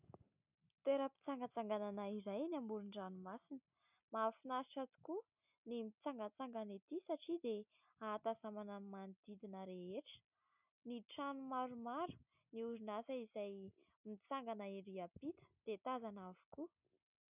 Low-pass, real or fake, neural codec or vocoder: 3.6 kHz; real; none